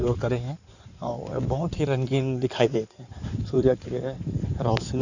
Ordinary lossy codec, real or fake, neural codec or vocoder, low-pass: none; fake; codec, 16 kHz in and 24 kHz out, 2.2 kbps, FireRedTTS-2 codec; 7.2 kHz